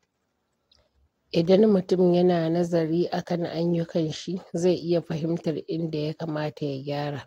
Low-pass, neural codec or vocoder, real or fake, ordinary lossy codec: 19.8 kHz; none; real; AAC, 48 kbps